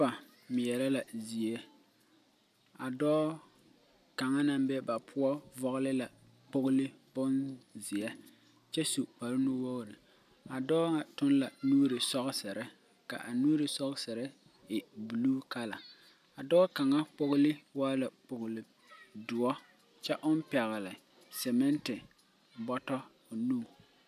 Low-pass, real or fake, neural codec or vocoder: 14.4 kHz; real; none